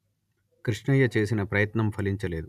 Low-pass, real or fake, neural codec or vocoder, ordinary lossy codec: 14.4 kHz; real; none; none